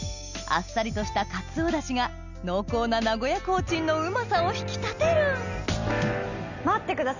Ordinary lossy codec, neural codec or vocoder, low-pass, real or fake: none; none; 7.2 kHz; real